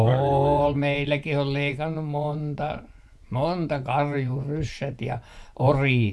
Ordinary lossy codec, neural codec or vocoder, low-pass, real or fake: none; vocoder, 24 kHz, 100 mel bands, Vocos; none; fake